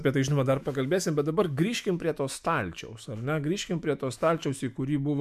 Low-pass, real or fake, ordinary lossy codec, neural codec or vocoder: 14.4 kHz; fake; MP3, 96 kbps; autoencoder, 48 kHz, 128 numbers a frame, DAC-VAE, trained on Japanese speech